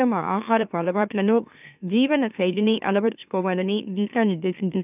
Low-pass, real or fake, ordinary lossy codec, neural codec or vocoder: 3.6 kHz; fake; none; autoencoder, 44.1 kHz, a latent of 192 numbers a frame, MeloTTS